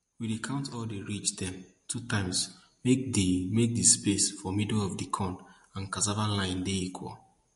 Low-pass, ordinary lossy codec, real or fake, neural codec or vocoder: 14.4 kHz; MP3, 48 kbps; real; none